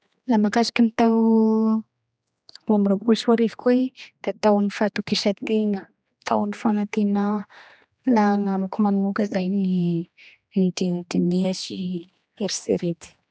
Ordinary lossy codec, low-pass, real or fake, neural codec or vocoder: none; none; fake; codec, 16 kHz, 2 kbps, X-Codec, HuBERT features, trained on general audio